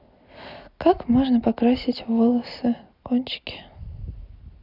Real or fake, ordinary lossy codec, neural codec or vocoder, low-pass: real; none; none; 5.4 kHz